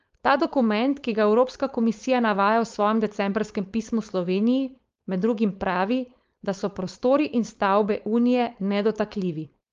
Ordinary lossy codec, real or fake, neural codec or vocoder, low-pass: Opus, 32 kbps; fake; codec, 16 kHz, 4.8 kbps, FACodec; 7.2 kHz